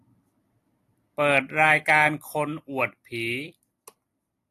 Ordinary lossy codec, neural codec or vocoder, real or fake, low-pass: AAC, 48 kbps; none; real; 14.4 kHz